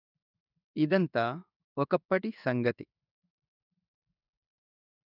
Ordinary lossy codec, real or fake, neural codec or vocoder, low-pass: none; fake; codec, 16 kHz in and 24 kHz out, 1 kbps, XY-Tokenizer; 5.4 kHz